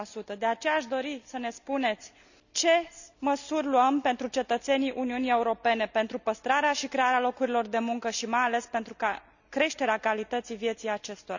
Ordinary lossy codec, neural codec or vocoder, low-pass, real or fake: none; none; 7.2 kHz; real